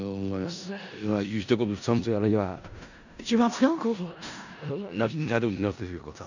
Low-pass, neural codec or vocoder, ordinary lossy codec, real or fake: 7.2 kHz; codec, 16 kHz in and 24 kHz out, 0.4 kbps, LongCat-Audio-Codec, four codebook decoder; none; fake